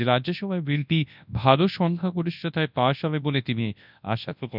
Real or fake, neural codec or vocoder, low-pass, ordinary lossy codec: fake; codec, 24 kHz, 0.9 kbps, WavTokenizer, large speech release; 5.4 kHz; none